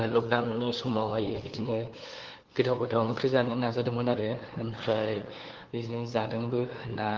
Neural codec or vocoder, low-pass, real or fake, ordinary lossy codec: codec, 16 kHz, 2 kbps, FunCodec, trained on LibriTTS, 25 frames a second; 7.2 kHz; fake; Opus, 16 kbps